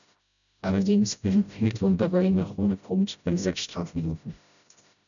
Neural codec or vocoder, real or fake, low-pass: codec, 16 kHz, 0.5 kbps, FreqCodec, smaller model; fake; 7.2 kHz